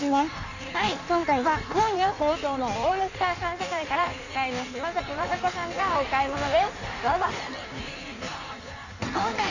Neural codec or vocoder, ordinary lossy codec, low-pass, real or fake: codec, 16 kHz in and 24 kHz out, 1.1 kbps, FireRedTTS-2 codec; none; 7.2 kHz; fake